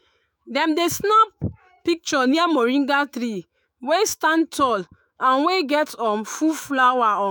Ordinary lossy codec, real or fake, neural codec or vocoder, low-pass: none; fake; autoencoder, 48 kHz, 128 numbers a frame, DAC-VAE, trained on Japanese speech; none